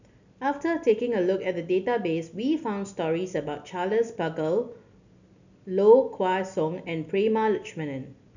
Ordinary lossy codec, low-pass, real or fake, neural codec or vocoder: none; 7.2 kHz; real; none